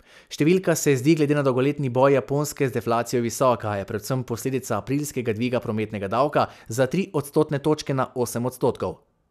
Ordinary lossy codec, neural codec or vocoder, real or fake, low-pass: none; none; real; 14.4 kHz